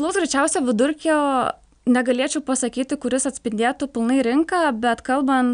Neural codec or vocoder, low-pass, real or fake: none; 9.9 kHz; real